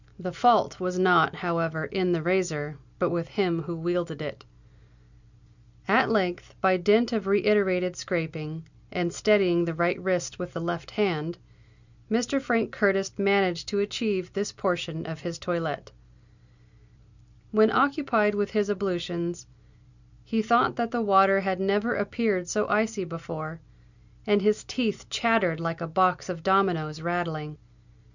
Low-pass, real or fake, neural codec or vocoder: 7.2 kHz; real; none